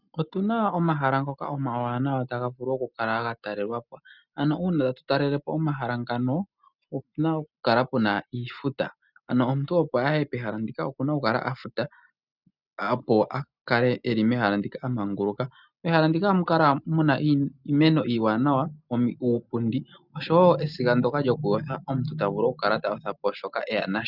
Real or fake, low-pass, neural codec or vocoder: real; 5.4 kHz; none